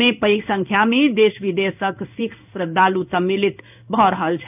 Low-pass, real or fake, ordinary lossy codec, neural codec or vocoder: 3.6 kHz; fake; none; codec, 16 kHz in and 24 kHz out, 1 kbps, XY-Tokenizer